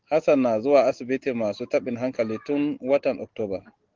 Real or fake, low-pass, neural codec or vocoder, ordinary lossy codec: real; 7.2 kHz; none; Opus, 16 kbps